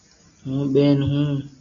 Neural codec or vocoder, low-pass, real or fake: none; 7.2 kHz; real